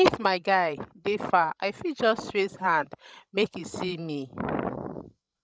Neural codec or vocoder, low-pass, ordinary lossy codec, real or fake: codec, 16 kHz, 16 kbps, FreqCodec, larger model; none; none; fake